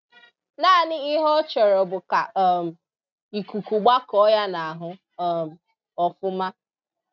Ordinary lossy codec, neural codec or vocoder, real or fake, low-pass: none; none; real; 7.2 kHz